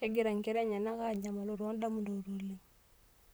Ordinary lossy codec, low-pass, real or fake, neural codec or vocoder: none; none; fake; vocoder, 44.1 kHz, 128 mel bands, Pupu-Vocoder